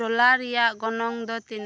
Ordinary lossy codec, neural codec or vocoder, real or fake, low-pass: none; none; real; none